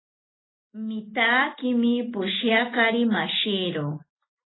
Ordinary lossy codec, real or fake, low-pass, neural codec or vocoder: AAC, 16 kbps; real; 7.2 kHz; none